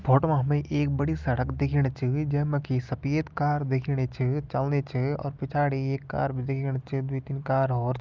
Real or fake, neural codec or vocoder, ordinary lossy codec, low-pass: real; none; Opus, 32 kbps; 7.2 kHz